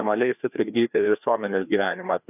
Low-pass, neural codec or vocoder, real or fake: 3.6 kHz; codec, 16 kHz, 2 kbps, FreqCodec, larger model; fake